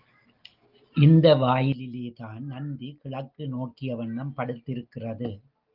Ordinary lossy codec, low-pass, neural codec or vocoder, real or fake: Opus, 24 kbps; 5.4 kHz; none; real